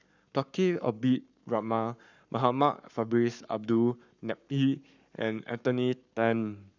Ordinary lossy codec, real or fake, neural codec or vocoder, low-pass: none; fake; codec, 44.1 kHz, 7.8 kbps, Pupu-Codec; 7.2 kHz